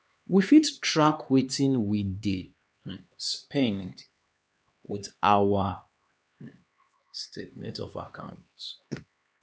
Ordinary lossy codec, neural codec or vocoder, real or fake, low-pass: none; codec, 16 kHz, 2 kbps, X-Codec, HuBERT features, trained on LibriSpeech; fake; none